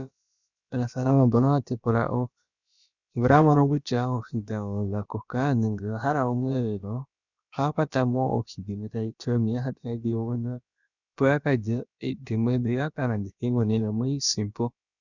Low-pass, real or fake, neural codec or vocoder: 7.2 kHz; fake; codec, 16 kHz, about 1 kbps, DyCAST, with the encoder's durations